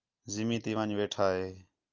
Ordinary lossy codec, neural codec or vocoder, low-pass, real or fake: Opus, 24 kbps; none; 7.2 kHz; real